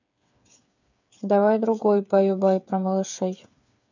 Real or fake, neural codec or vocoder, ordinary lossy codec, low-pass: fake; codec, 16 kHz, 8 kbps, FreqCodec, smaller model; none; 7.2 kHz